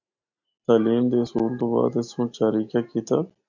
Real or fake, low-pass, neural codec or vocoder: real; 7.2 kHz; none